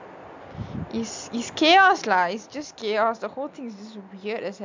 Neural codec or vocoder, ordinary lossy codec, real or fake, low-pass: none; MP3, 64 kbps; real; 7.2 kHz